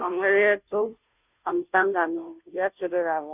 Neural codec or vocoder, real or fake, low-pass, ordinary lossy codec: codec, 16 kHz, 0.5 kbps, FunCodec, trained on Chinese and English, 25 frames a second; fake; 3.6 kHz; none